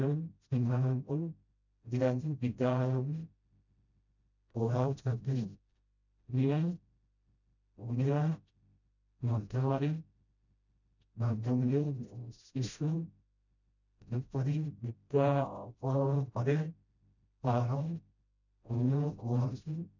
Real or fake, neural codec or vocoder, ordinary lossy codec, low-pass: fake; codec, 16 kHz, 0.5 kbps, FreqCodec, smaller model; none; 7.2 kHz